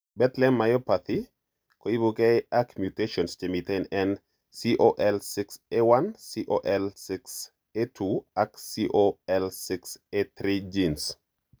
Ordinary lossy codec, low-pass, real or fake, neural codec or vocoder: none; none; real; none